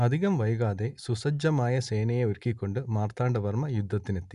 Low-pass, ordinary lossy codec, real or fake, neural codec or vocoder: 10.8 kHz; none; real; none